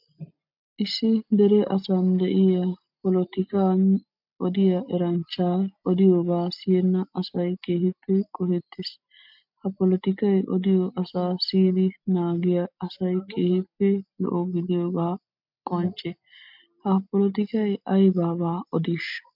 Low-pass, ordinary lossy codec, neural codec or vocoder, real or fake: 5.4 kHz; MP3, 48 kbps; none; real